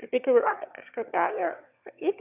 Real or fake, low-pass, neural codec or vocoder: fake; 3.6 kHz; autoencoder, 22.05 kHz, a latent of 192 numbers a frame, VITS, trained on one speaker